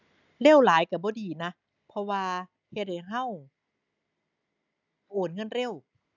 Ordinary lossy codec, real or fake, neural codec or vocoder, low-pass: none; real; none; 7.2 kHz